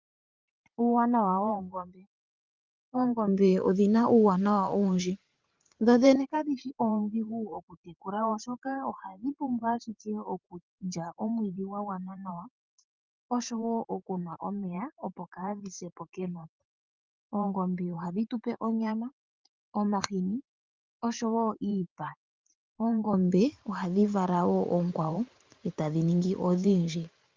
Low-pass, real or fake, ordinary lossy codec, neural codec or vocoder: 7.2 kHz; fake; Opus, 32 kbps; vocoder, 44.1 kHz, 128 mel bands every 512 samples, BigVGAN v2